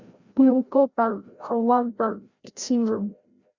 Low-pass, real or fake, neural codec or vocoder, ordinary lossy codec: 7.2 kHz; fake; codec, 16 kHz, 0.5 kbps, FreqCodec, larger model; Opus, 64 kbps